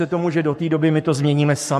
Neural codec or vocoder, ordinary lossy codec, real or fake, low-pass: codec, 44.1 kHz, 7.8 kbps, Pupu-Codec; MP3, 64 kbps; fake; 14.4 kHz